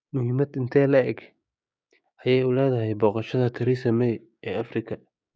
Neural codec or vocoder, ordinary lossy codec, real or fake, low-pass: codec, 16 kHz, 6 kbps, DAC; none; fake; none